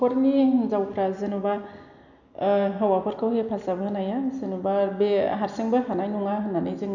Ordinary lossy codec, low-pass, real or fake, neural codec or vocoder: none; 7.2 kHz; real; none